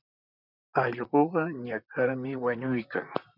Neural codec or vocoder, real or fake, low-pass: vocoder, 44.1 kHz, 128 mel bands, Pupu-Vocoder; fake; 5.4 kHz